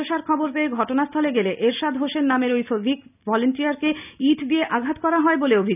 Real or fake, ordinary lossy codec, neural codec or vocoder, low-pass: real; none; none; 3.6 kHz